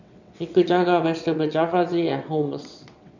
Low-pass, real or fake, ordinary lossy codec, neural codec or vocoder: 7.2 kHz; fake; none; vocoder, 22.05 kHz, 80 mel bands, WaveNeXt